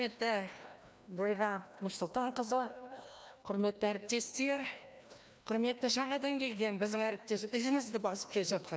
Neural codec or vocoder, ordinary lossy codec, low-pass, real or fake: codec, 16 kHz, 1 kbps, FreqCodec, larger model; none; none; fake